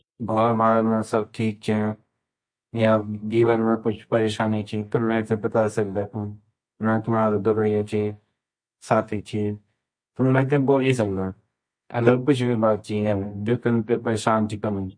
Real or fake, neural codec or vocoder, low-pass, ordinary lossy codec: fake; codec, 24 kHz, 0.9 kbps, WavTokenizer, medium music audio release; 9.9 kHz; MP3, 48 kbps